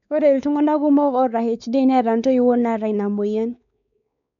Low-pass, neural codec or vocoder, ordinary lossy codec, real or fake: 7.2 kHz; codec, 16 kHz, 4 kbps, X-Codec, WavLM features, trained on Multilingual LibriSpeech; none; fake